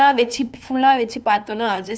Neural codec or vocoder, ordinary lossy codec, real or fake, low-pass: codec, 16 kHz, 2 kbps, FunCodec, trained on LibriTTS, 25 frames a second; none; fake; none